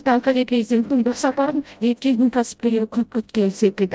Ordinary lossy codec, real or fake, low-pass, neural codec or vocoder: none; fake; none; codec, 16 kHz, 0.5 kbps, FreqCodec, smaller model